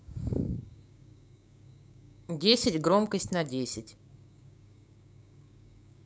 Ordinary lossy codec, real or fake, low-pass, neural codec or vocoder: none; fake; none; codec, 16 kHz, 6 kbps, DAC